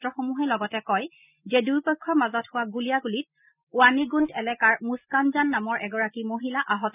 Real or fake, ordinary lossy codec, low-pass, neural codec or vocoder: real; none; 3.6 kHz; none